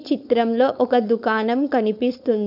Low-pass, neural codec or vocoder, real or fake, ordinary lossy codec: 5.4 kHz; codec, 16 kHz, 4.8 kbps, FACodec; fake; none